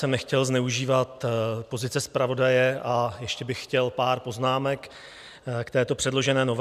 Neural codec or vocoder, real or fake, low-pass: none; real; 14.4 kHz